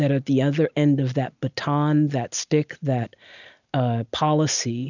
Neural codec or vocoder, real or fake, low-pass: none; real; 7.2 kHz